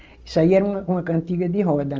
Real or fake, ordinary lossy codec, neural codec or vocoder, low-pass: real; Opus, 24 kbps; none; 7.2 kHz